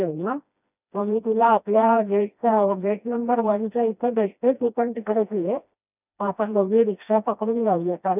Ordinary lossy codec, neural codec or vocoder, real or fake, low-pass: none; codec, 16 kHz, 1 kbps, FreqCodec, smaller model; fake; 3.6 kHz